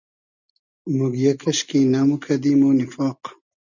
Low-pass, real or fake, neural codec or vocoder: 7.2 kHz; real; none